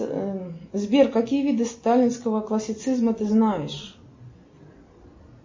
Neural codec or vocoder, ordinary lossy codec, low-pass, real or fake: none; MP3, 32 kbps; 7.2 kHz; real